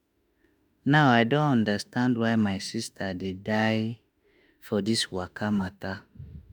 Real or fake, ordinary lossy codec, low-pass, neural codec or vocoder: fake; none; none; autoencoder, 48 kHz, 32 numbers a frame, DAC-VAE, trained on Japanese speech